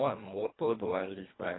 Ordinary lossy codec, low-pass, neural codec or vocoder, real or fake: AAC, 16 kbps; 7.2 kHz; codec, 24 kHz, 1.5 kbps, HILCodec; fake